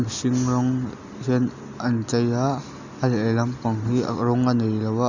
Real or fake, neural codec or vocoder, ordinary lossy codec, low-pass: real; none; none; 7.2 kHz